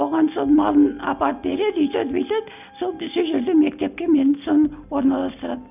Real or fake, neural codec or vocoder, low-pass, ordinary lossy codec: real; none; 3.6 kHz; none